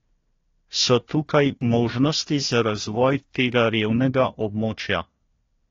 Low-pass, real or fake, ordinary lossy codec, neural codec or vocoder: 7.2 kHz; fake; AAC, 32 kbps; codec, 16 kHz, 1 kbps, FunCodec, trained on Chinese and English, 50 frames a second